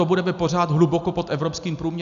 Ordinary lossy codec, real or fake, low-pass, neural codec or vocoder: AAC, 96 kbps; real; 7.2 kHz; none